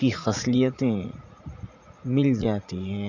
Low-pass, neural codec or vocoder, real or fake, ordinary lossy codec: 7.2 kHz; vocoder, 44.1 kHz, 80 mel bands, Vocos; fake; none